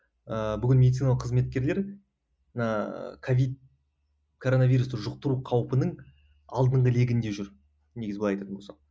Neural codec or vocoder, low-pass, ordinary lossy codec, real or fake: none; none; none; real